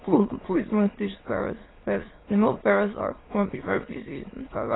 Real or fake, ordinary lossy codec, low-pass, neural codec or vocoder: fake; AAC, 16 kbps; 7.2 kHz; autoencoder, 22.05 kHz, a latent of 192 numbers a frame, VITS, trained on many speakers